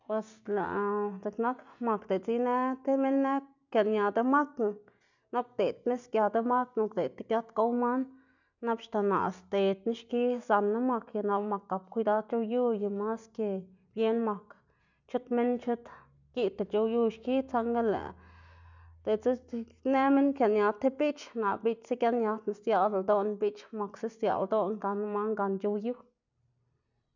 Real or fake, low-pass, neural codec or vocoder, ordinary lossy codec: real; 7.2 kHz; none; none